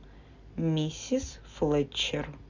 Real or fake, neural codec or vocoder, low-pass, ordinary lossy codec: real; none; 7.2 kHz; Opus, 64 kbps